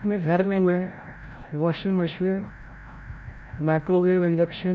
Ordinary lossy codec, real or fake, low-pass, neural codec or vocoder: none; fake; none; codec, 16 kHz, 0.5 kbps, FreqCodec, larger model